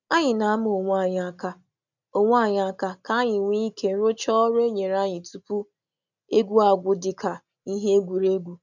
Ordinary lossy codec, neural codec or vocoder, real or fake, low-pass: none; none; real; 7.2 kHz